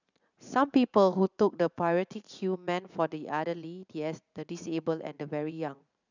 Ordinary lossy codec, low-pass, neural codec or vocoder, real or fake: none; 7.2 kHz; vocoder, 44.1 kHz, 80 mel bands, Vocos; fake